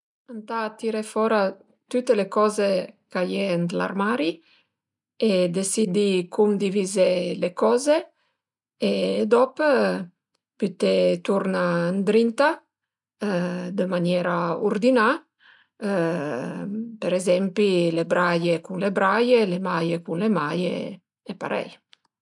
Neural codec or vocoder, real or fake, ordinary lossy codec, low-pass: none; real; none; 10.8 kHz